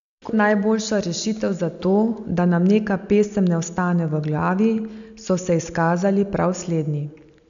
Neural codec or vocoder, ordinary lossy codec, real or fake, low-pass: none; none; real; 7.2 kHz